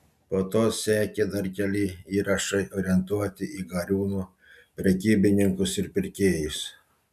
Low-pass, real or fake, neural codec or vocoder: 14.4 kHz; real; none